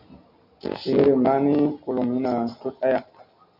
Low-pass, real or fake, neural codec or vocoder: 5.4 kHz; real; none